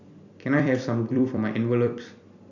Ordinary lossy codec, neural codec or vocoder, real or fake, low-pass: none; vocoder, 44.1 kHz, 128 mel bands every 256 samples, BigVGAN v2; fake; 7.2 kHz